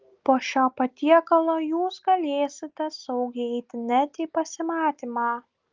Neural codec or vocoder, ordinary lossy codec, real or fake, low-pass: none; Opus, 32 kbps; real; 7.2 kHz